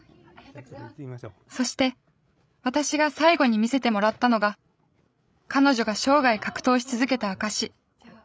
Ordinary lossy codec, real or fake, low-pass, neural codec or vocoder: none; fake; none; codec, 16 kHz, 16 kbps, FreqCodec, larger model